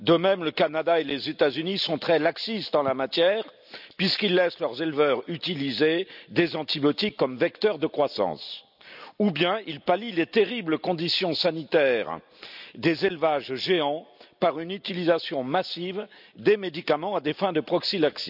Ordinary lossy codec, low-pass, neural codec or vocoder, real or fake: none; 5.4 kHz; none; real